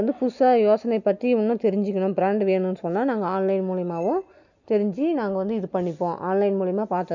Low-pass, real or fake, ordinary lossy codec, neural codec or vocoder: 7.2 kHz; real; none; none